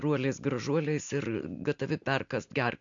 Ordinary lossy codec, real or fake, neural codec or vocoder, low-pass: MP3, 64 kbps; real; none; 7.2 kHz